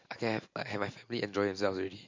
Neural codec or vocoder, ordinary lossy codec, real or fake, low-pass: none; MP3, 48 kbps; real; 7.2 kHz